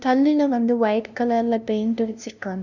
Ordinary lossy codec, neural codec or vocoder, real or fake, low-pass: none; codec, 16 kHz, 0.5 kbps, FunCodec, trained on LibriTTS, 25 frames a second; fake; 7.2 kHz